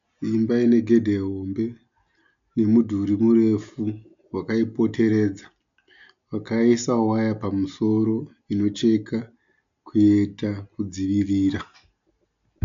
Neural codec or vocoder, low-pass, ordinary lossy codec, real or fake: none; 7.2 kHz; MP3, 64 kbps; real